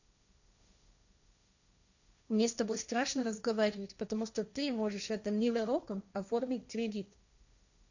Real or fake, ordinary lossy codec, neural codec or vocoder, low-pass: fake; none; codec, 16 kHz, 1.1 kbps, Voila-Tokenizer; 7.2 kHz